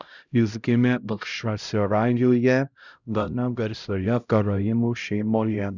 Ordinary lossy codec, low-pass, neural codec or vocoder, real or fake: Opus, 64 kbps; 7.2 kHz; codec, 16 kHz, 0.5 kbps, X-Codec, HuBERT features, trained on LibriSpeech; fake